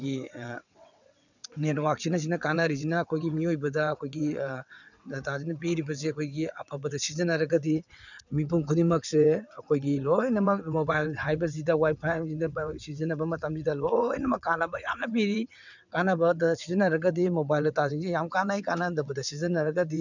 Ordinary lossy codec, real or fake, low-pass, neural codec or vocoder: none; fake; 7.2 kHz; vocoder, 22.05 kHz, 80 mel bands, WaveNeXt